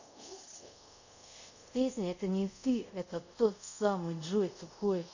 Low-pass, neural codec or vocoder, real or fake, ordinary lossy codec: 7.2 kHz; codec, 24 kHz, 0.5 kbps, DualCodec; fake; none